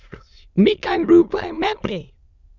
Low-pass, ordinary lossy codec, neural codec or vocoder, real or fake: 7.2 kHz; Opus, 64 kbps; autoencoder, 22.05 kHz, a latent of 192 numbers a frame, VITS, trained on many speakers; fake